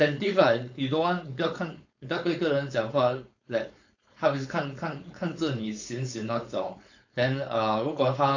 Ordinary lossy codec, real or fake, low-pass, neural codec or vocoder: AAC, 48 kbps; fake; 7.2 kHz; codec, 16 kHz, 4.8 kbps, FACodec